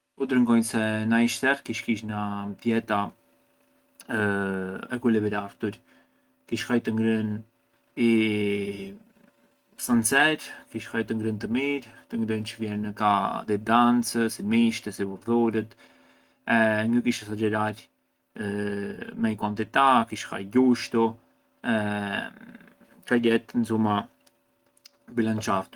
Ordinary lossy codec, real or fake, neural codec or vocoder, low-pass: Opus, 24 kbps; real; none; 19.8 kHz